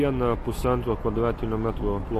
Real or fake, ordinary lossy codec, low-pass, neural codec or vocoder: real; AAC, 48 kbps; 14.4 kHz; none